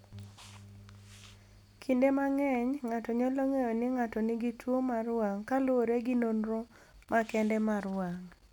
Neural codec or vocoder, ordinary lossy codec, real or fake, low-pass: none; none; real; 19.8 kHz